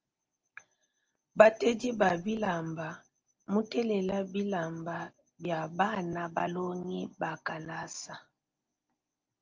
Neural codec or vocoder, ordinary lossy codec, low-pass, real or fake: none; Opus, 24 kbps; 7.2 kHz; real